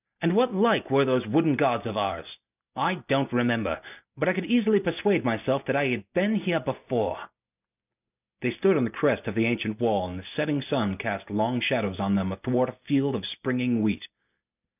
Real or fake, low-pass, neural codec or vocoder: real; 3.6 kHz; none